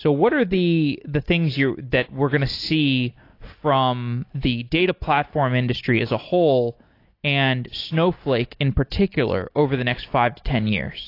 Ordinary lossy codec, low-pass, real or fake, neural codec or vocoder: AAC, 32 kbps; 5.4 kHz; real; none